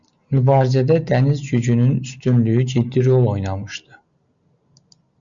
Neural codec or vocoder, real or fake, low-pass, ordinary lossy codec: none; real; 7.2 kHz; Opus, 64 kbps